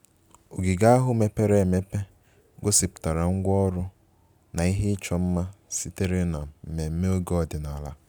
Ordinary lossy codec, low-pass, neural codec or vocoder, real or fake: none; none; none; real